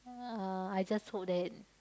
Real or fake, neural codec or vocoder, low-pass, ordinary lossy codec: real; none; none; none